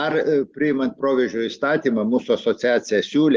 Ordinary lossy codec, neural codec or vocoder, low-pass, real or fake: Opus, 32 kbps; none; 7.2 kHz; real